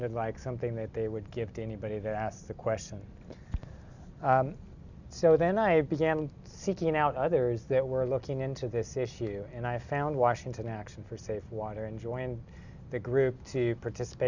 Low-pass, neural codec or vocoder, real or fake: 7.2 kHz; none; real